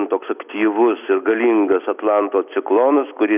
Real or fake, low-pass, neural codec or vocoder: real; 3.6 kHz; none